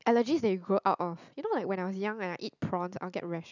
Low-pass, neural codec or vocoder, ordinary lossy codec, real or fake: 7.2 kHz; none; none; real